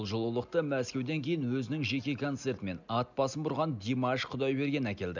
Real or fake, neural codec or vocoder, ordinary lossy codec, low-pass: real; none; none; 7.2 kHz